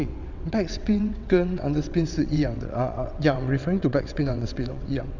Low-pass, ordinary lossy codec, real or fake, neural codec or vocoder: 7.2 kHz; none; fake; vocoder, 22.05 kHz, 80 mel bands, WaveNeXt